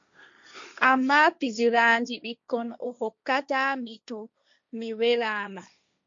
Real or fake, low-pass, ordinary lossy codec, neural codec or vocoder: fake; 7.2 kHz; MP3, 64 kbps; codec, 16 kHz, 1.1 kbps, Voila-Tokenizer